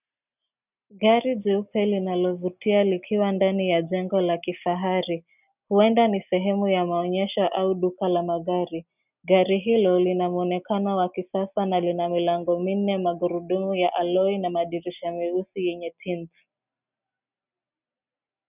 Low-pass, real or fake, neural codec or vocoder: 3.6 kHz; real; none